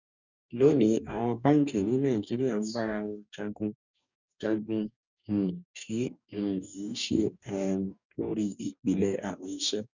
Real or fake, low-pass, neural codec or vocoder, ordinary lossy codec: fake; 7.2 kHz; codec, 44.1 kHz, 2.6 kbps, DAC; none